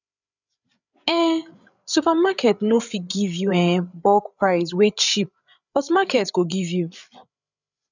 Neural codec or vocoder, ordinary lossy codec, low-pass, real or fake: codec, 16 kHz, 8 kbps, FreqCodec, larger model; none; 7.2 kHz; fake